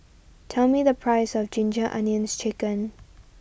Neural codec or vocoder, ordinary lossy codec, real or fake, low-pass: none; none; real; none